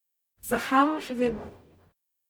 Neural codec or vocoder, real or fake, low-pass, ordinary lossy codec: codec, 44.1 kHz, 0.9 kbps, DAC; fake; none; none